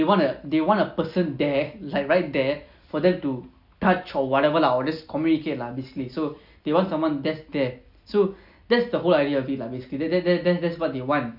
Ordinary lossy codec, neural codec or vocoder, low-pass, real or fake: none; none; 5.4 kHz; real